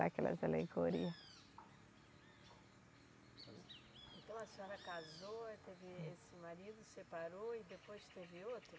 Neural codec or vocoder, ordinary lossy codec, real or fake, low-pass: none; none; real; none